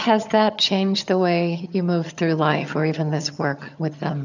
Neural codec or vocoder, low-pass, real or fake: vocoder, 22.05 kHz, 80 mel bands, HiFi-GAN; 7.2 kHz; fake